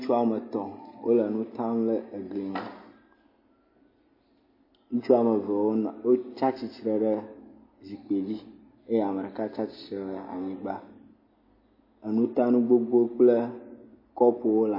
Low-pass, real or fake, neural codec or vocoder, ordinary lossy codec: 5.4 kHz; real; none; MP3, 24 kbps